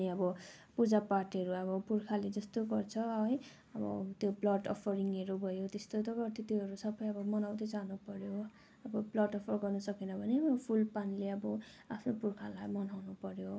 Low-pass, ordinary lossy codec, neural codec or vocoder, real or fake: none; none; none; real